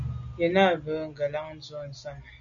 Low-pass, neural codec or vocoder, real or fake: 7.2 kHz; none; real